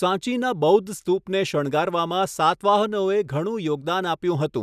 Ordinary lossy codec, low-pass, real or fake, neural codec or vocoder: none; 14.4 kHz; real; none